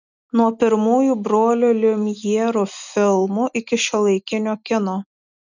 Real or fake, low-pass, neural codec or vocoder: real; 7.2 kHz; none